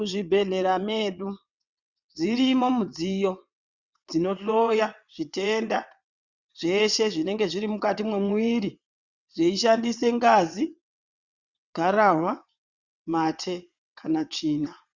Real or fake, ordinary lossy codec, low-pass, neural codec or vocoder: fake; Opus, 64 kbps; 7.2 kHz; vocoder, 22.05 kHz, 80 mel bands, WaveNeXt